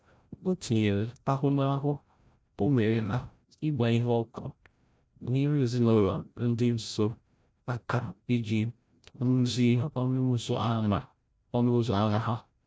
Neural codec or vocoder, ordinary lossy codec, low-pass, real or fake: codec, 16 kHz, 0.5 kbps, FreqCodec, larger model; none; none; fake